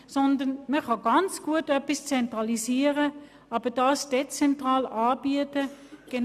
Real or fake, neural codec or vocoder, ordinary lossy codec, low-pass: real; none; none; 14.4 kHz